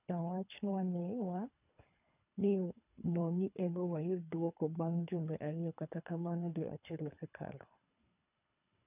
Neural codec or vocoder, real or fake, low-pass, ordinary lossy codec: codec, 24 kHz, 3 kbps, HILCodec; fake; 3.6 kHz; none